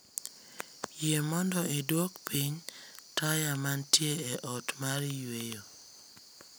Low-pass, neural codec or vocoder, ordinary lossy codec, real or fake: none; none; none; real